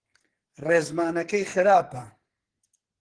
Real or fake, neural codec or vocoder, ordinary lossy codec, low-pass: fake; codec, 44.1 kHz, 2.6 kbps, SNAC; Opus, 16 kbps; 9.9 kHz